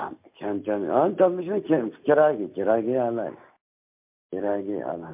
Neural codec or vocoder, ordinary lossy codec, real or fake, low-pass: none; MP3, 32 kbps; real; 3.6 kHz